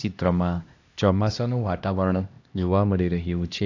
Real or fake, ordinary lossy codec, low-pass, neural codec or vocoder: fake; AAC, 48 kbps; 7.2 kHz; codec, 16 kHz, 1 kbps, X-Codec, HuBERT features, trained on LibriSpeech